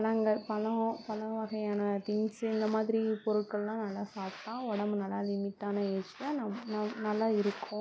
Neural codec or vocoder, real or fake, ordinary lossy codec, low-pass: none; real; none; none